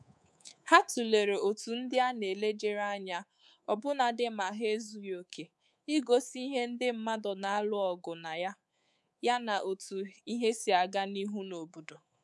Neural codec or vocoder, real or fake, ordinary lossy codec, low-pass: codec, 24 kHz, 3.1 kbps, DualCodec; fake; none; none